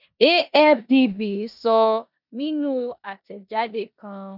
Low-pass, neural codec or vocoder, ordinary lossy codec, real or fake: 5.4 kHz; codec, 16 kHz in and 24 kHz out, 0.9 kbps, LongCat-Audio-Codec, four codebook decoder; none; fake